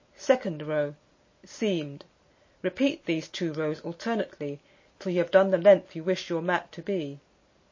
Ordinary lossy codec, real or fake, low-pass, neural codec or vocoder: MP3, 32 kbps; real; 7.2 kHz; none